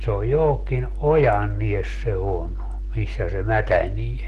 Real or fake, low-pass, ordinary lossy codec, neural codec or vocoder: real; 14.4 kHz; Opus, 16 kbps; none